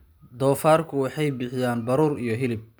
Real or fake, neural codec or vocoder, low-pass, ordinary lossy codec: real; none; none; none